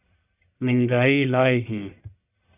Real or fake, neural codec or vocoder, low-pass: fake; codec, 44.1 kHz, 1.7 kbps, Pupu-Codec; 3.6 kHz